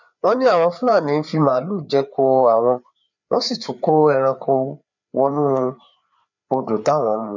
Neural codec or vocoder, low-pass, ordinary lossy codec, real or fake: codec, 16 kHz, 4 kbps, FreqCodec, larger model; 7.2 kHz; none; fake